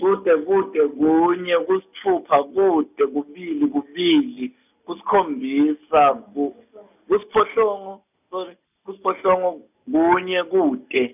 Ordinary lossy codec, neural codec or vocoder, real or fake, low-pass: none; none; real; 3.6 kHz